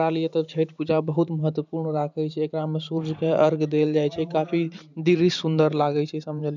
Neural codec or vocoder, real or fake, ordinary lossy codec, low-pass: vocoder, 44.1 kHz, 128 mel bands every 512 samples, BigVGAN v2; fake; none; 7.2 kHz